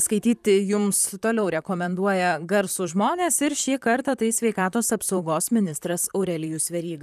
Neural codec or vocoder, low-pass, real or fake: vocoder, 44.1 kHz, 128 mel bands, Pupu-Vocoder; 14.4 kHz; fake